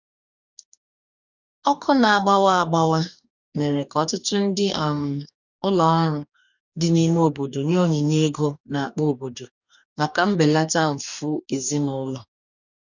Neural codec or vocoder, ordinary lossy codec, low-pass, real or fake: codec, 44.1 kHz, 2.6 kbps, DAC; none; 7.2 kHz; fake